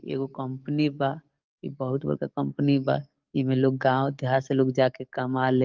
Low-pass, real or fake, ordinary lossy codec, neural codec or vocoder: 7.2 kHz; fake; Opus, 32 kbps; codec, 44.1 kHz, 7.8 kbps, DAC